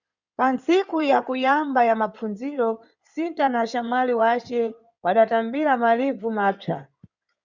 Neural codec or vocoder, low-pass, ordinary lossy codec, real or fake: codec, 16 kHz in and 24 kHz out, 2.2 kbps, FireRedTTS-2 codec; 7.2 kHz; Opus, 64 kbps; fake